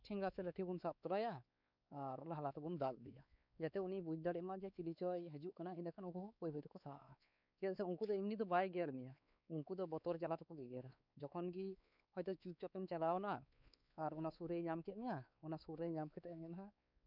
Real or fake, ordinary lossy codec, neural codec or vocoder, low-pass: fake; none; codec, 16 kHz, 4 kbps, X-Codec, WavLM features, trained on Multilingual LibriSpeech; 5.4 kHz